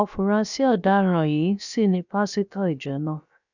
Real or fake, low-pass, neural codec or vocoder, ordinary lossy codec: fake; 7.2 kHz; codec, 16 kHz, about 1 kbps, DyCAST, with the encoder's durations; none